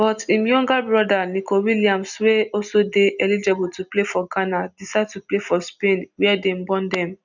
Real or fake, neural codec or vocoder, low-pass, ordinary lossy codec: real; none; 7.2 kHz; none